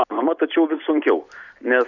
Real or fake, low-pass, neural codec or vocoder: real; 7.2 kHz; none